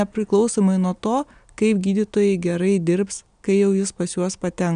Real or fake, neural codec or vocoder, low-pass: real; none; 9.9 kHz